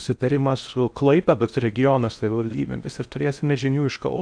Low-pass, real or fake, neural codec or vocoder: 9.9 kHz; fake; codec, 16 kHz in and 24 kHz out, 0.6 kbps, FocalCodec, streaming, 4096 codes